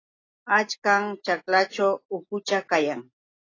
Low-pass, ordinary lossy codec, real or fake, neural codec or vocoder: 7.2 kHz; AAC, 32 kbps; real; none